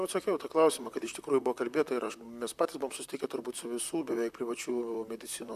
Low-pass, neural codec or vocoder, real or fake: 14.4 kHz; vocoder, 44.1 kHz, 128 mel bands, Pupu-Vocoder; fake